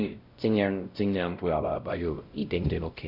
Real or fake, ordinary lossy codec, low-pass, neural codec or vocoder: fake; Opus, 64 kbps; 5.4 kHz; codec, 16 kHz, 0.5 kbps, X-Codec, WavLM features, trained on Multilingual LibriSpeech